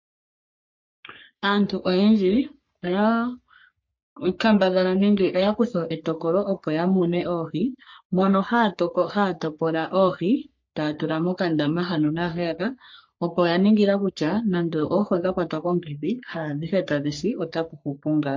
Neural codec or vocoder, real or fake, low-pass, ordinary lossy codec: codec, 44.1 kHz, 3.4 kbps, Pupu-Codec; fake; 7.2 kHz; MP3, 48 kbps